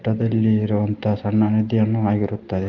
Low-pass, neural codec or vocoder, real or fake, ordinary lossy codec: 7.2 kHz; none; real; Opus, 24 kbps